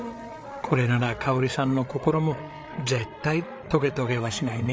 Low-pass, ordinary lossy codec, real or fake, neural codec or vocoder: none; none; fake; codec, 16 kHz, 8 kbps, FreqCodec, larger model